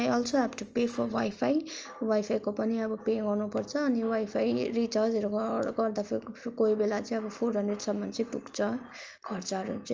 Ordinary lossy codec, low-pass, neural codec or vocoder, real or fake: Opus, 24 kbps; 7.2 kHz; none; real